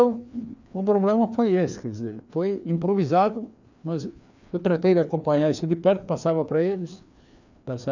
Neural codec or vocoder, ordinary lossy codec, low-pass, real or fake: codec, 16 kHz, 2 kbps, FreqCodec, larger model; none; 7.2 kHz; fake